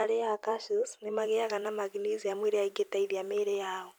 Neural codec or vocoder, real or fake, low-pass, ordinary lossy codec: vocoder, 44.1 kHz, 128 mel bands every 256 samples, BigVGAN v2; fake; none; none